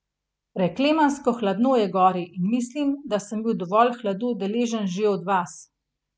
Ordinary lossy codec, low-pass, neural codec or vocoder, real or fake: none; none; none; real